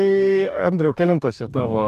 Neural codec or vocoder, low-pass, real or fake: codec, 44.1 kHz, 2.6 kbps, DAC; 14.4 kHz; fake